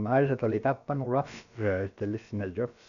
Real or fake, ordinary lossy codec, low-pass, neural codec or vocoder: fake; none; 7.2 kHz; codec, 16 kHz, about 1 kbps, DyCAST, with the encoder's durations